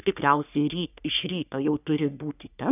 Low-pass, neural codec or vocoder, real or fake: 3.6 kHz; codec, 44.1 kHz, 3.4 kbps, Pupu-Codec; fake